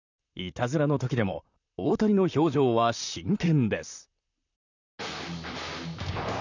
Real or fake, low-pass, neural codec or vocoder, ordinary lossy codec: fake; 7.2 kHz; vocoder, 44.1 kHz, 128 mel bands, Pupu-Vocoder; none